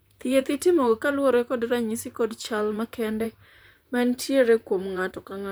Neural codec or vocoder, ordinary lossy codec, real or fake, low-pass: vocoder, 44.1 kHz, 128 mel bands, Pupu-Vocoder; none; fake; none